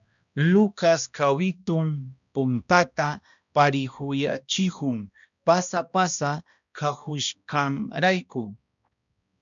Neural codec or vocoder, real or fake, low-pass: codec, 16 kHz, 1 kbps, X-Codec, HuBERT features, trained on balanced general audio; fake; 7.2 kHz